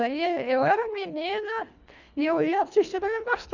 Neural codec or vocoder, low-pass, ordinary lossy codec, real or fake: codec, 24 kHz, 1.5 kbps, HILCodec; 7.2 kHz; none; fake